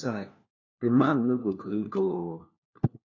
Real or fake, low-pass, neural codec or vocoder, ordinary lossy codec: fake; 7.2 kHz; codec, 16 kHz, 1 kbps, FunCodec, trained on LibriTTS, 50 frames a second; AAC, 48 kbps